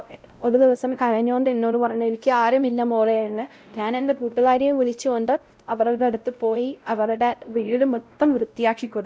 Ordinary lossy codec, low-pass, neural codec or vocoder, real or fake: none; none; codec, 16 kHz, 0.5 kbps, X-Codec, WavLM features, trained on Multilingual LibriSpeech; fake